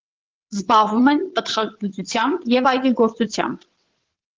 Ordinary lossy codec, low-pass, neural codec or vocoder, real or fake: Opus, 16 kbps; 7.2 kHz; vocoder, 22.05 kHz, 80 mel bands, WaveNeXt; fake